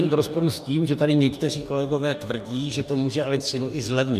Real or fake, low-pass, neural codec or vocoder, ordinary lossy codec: fake; 14.4 kHz; codec, 44.1 kHz, 2.6 kbps, DAC; MP3, 96 kbps